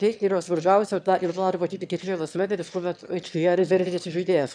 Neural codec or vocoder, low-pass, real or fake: autoencoder, 22.05 kHz, a latent of 192 numbers a frame, VITS, trained on one speaker; 9.9 kHz; fake